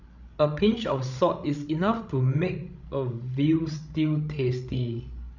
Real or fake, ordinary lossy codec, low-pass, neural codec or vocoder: fake; none; 7.2 kHz; codec, 16 kHz, 16 kbps, FreqCodec, larger model